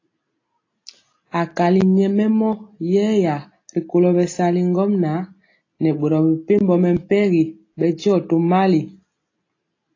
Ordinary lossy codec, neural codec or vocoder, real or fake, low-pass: AAC, 32 kbps; none; real; 7.2 kHz